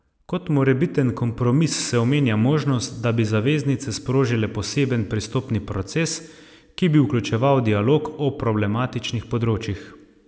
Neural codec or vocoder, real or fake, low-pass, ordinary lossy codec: none; real; none; none